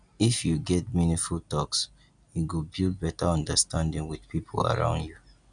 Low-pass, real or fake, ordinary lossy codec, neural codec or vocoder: 9.9 kHz; real; none; none